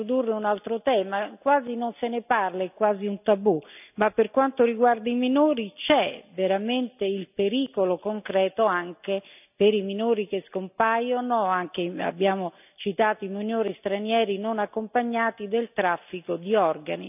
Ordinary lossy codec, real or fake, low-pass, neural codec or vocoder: none; real; 3.6 kHz; none